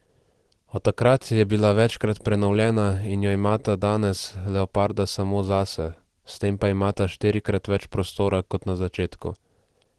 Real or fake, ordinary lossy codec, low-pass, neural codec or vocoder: real; Opus, 16 kbps; 10.8 kHz; none